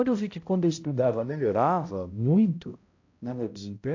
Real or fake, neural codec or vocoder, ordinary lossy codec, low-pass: fake; codec, 16 kHz, 0.5 kbps, X-Codec, HuBERT features, trained on balanced general audio; AAC, 48 kbps; 7.2 kHz